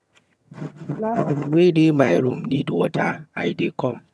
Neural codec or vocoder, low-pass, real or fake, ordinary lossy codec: vocoder, 22.05 kHz, 80 mel bands, HiFi-GAN; none; fake; none